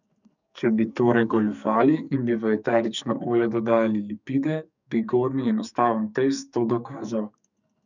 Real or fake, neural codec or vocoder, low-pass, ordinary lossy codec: fake; codec, 44.1 kHz, 2.6 kbps, SNAC; 7.2 kHz; none